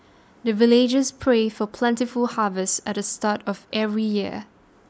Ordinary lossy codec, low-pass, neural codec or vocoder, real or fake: none; none; none; real